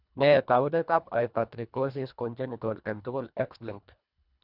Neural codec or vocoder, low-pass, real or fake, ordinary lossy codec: codec, 24 kHz, 1.5 kbps, HILCodec; 5.4 kHz; fake; none